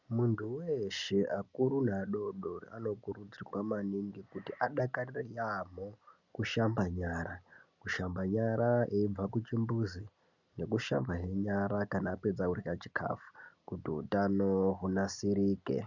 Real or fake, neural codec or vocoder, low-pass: real; none; 7.2 kHz